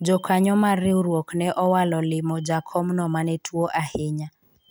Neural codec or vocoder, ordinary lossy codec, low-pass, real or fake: none; none; none; real